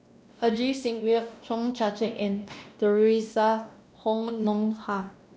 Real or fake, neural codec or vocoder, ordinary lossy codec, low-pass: fake; codec, 16 kHz, 1 kbps, X-Codec, WavLM features, trained on Multilingual LibriSpeech; none; none